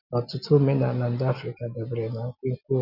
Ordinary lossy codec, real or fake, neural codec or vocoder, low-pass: none; real; none; 5.4 kHz